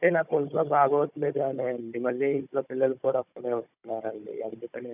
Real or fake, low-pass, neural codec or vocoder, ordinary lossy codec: fake; 3.6 kHz; codec, 16 kHz, 16 kbps, FunCodec, trained on Chinese and English, 50 frames a second; none